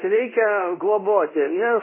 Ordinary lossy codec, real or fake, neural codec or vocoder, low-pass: MP3, 16 kbps; fake; codec, 24 kHz, 0.5 kbps, DualCodec; 3.6 kHz